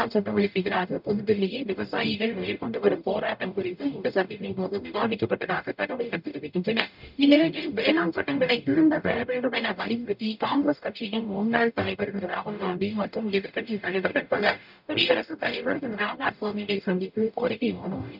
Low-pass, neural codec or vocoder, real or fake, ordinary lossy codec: 5.4 kHz; codec, 44.1 kHz, 0.9 kbps, DAC; fake; none